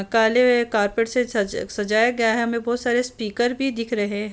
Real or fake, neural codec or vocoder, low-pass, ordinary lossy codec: real; none; none; none